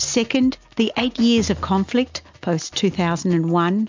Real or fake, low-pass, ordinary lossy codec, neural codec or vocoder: real; 7.2 kHz; MP3, 64 kbps; none